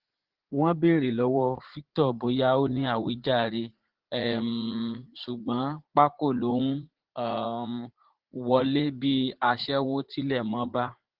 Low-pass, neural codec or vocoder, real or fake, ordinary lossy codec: 5.4 kHz; vocoder, 44.1 kHz, 80 mel bands, Vocos; fake; Opus, 16 kbps